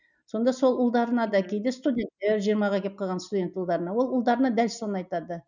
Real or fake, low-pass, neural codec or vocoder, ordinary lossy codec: real; 7.2 kHz; none; none